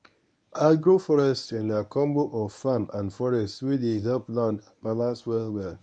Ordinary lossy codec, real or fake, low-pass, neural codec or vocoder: none; fake; 9.9 kHz; codec, 24 kHz, 0.9 kbps, WavTokenizer, medium speech release version 1